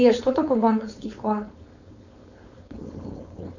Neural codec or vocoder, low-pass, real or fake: codec, 16 kHz, 4.8 kbps, FACodec; 7.2 kHz; fake